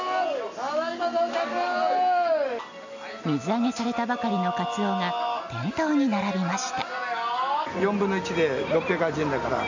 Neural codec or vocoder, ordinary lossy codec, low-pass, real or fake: none; MP3, 64 kbps; 7.2 kHz; real